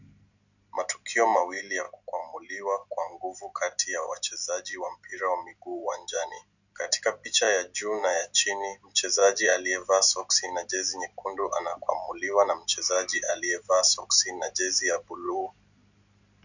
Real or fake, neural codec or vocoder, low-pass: real; none; 7.2 kHz